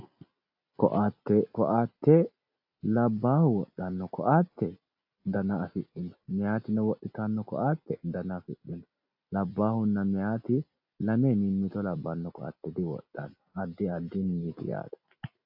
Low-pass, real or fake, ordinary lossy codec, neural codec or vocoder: 5.4 kHz; real; AAC, 48 kbps; none